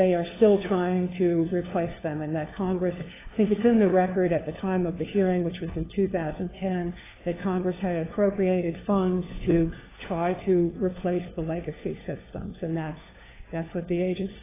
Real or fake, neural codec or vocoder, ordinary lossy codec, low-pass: fake; codec, 16 kHz, 4 kbps, FunCodec, trained on LibriTTS, 50 frames a second; AAC, 16 kbps; 3.6 kHz